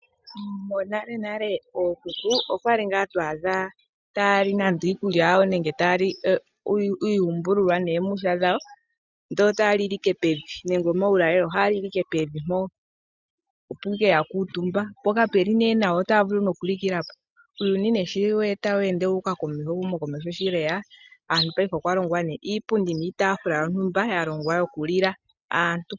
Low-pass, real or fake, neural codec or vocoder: 7.2 kHz; real; none